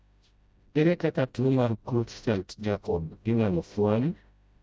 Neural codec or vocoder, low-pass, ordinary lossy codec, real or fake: codec, 16 kHz, 0.5 kbps, FreqCodec, smaller model; none; none; fake